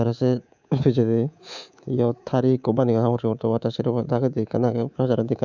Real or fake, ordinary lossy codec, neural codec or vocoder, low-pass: fake; none; codec, 24 kHz, 3.1 kbps, DualCodec; 7.2 kHz